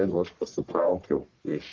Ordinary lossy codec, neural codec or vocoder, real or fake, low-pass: Opus, 16 kbps; codec, 44.1 kHz, 1.7 kbps, Pupu-Codec; fake; 7.2 kHz